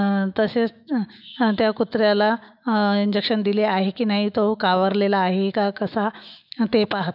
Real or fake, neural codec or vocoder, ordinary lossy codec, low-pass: fake; autoencoder, 48 kHz, 128 numbers a frame, DAC-VAE, trained on Japanese speech; none; 5.4 kHz